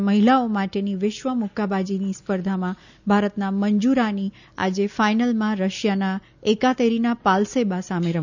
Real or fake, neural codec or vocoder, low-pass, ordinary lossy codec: real; none; 7.2 kHz; none